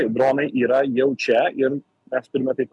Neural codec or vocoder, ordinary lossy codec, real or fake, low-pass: none; MP3, 96 kbps; real; 10.8 kHz